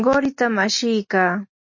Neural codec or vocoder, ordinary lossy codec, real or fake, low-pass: none; MP3, 32 kbps; real; 7.2 kHz